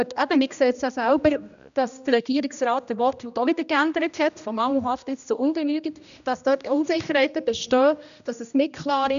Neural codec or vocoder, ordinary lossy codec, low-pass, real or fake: codec, 16 kHz, 1 kbps, X-Codec, HuBERT features, trained on general audio; none; 7.2 kHz; fake